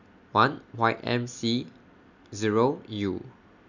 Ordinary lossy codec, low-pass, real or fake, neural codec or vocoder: none; 7.2 kHz; real; none